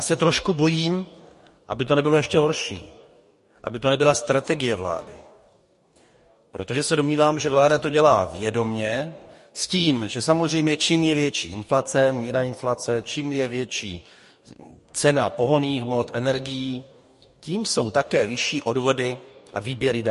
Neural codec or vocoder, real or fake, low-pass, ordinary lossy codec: codec, 44.1 kHz, 2.6 kbps, DAC; fake; 14.4 kHz; MP3, 48 kbps